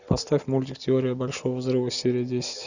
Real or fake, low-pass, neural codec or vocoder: real; 7.2 kHz; none